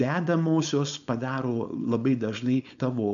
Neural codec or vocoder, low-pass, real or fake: codec, 16 kHz, 4.8 kbps, FACodec; 7.2 kHz; fake